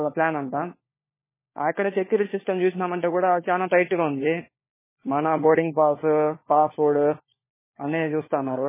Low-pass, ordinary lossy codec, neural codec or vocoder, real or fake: 3.6 kHz; MP3, 16 kbps; codec, 16 kHz, 4 kbps, FunCodec, trained on LibriTTS, 50 frames a second; fake